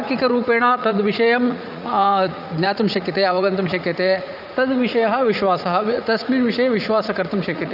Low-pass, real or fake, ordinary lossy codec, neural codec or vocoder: 5.4 kHz; fake; none; vocoder, 44.1 kHz, 80 mel bands, Vocos